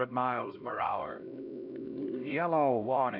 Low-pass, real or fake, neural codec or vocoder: 5.4 kHz; fake; codec, 16 kHz, 1 kbps, X-Codec, HuBERT features, trained on LibriSpeech